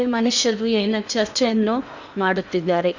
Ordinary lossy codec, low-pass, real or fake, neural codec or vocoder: none; 7.2 kHz; fake; codec, 16 kHz in and 24 kHz out, 0.8 kbps, FocalCodec, streaming, 65536 codes